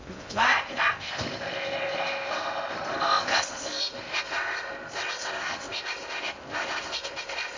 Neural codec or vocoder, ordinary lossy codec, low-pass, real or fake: codec, 16 kHz in and 24 kHz out, 0.6 kbps, FocalCodec, streaming, 2048 codes; MP3, 48 kbps; 7.2 kHz; fake